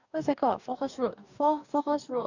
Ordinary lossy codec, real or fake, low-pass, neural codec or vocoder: none; fake; 7.2 kHz; codec, 44.1 kHz, 2.6 kbps, DAC